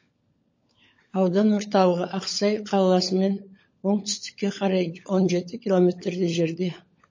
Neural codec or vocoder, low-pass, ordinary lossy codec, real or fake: codec, 16 kHz, 16 kbps, FunCodec, trained on LibriTTS, 50 frames a second; 7.2 kHz; MP3, 32 kbps; fake